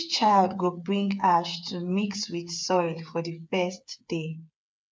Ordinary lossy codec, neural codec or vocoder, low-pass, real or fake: none; codec, 16 kHz, 8 kbps, FreqCodec, smaller model; none; fake